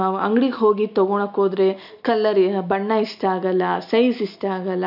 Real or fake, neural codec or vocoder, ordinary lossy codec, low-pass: real; none; none; 5.4 kHz